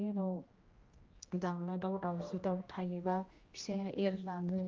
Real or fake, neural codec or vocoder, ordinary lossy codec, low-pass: fake; codec, 16 kHz, 1 kbps, X-Codec, HuBERT features, trained on general audio; Opus, 32 kbps; 7.2 kHz